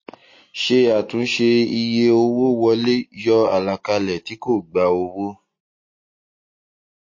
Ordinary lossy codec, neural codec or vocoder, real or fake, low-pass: MP3, 32 kbps; none; real; 7.2 kHz